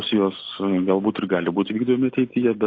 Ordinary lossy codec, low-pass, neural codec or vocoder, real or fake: AAC, 32 kbps; 7.2 kHz; none; real